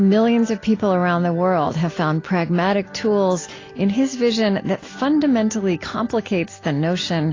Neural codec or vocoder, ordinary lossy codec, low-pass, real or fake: none; AAC, 32 kbps; 7.2 kHz; real